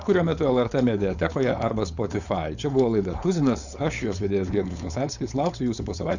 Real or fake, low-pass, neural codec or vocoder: fake; 7.2 kHz; codec, 16 kHz, 4.8 kbps, FACodec